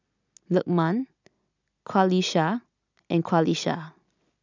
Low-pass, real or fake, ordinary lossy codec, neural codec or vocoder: 7.2 kHz; real; none; none